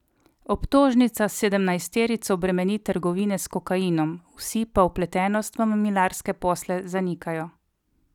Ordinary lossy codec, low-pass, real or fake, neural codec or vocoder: none; 19.8 kHz; real; none